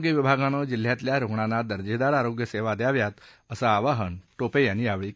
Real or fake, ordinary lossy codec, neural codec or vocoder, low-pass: real; none; none; none